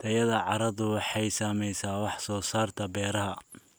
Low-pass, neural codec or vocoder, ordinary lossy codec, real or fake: none; none; none; real